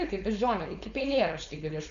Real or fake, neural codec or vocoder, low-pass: fake; codec, 16 kHz, 4.8 kbps, FACodec; 7.2 kHz